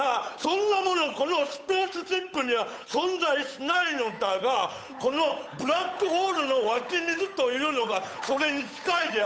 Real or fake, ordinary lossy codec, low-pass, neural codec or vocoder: fake; none; none; codec, 16 kHz, 8 kbps, FunCodec, trained on Chinese and English, 25 frames a second